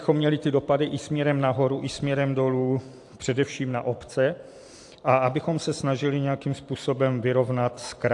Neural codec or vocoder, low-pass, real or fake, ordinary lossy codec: vocoder, 44.1 kHz, 128 mel bands every 256 samples, BigVGAN v2; 10.8 kHz; fake; AAC, 64 kbps